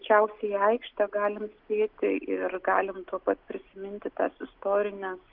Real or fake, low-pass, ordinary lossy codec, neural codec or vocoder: real; 5.4 kHz; Opus, 24 kbps; none